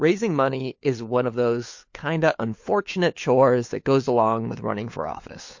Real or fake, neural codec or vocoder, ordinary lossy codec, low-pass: fake; codec, 16 kHz, 4 kbps, FunCodec, trained on LibriTTS, 50 frames a second; MP3, 48 kbps; 7.2 kHz